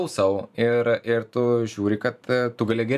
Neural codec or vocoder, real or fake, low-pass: vocoder, 44.1 kHz, 128 mel bands every 512 samples, BigVGAN v2; fake; 14.4 kHz